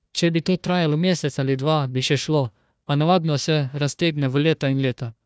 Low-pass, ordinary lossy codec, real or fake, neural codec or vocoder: none; none; fake; codec, 16 kHz, 1 kbps, FunCodec, trained on Chinese and English, 50 frames a second